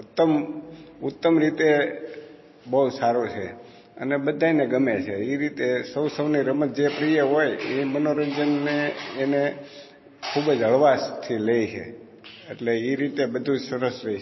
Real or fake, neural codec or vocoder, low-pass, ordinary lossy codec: real; none; 7.2 kHz; MP3, 24 kbps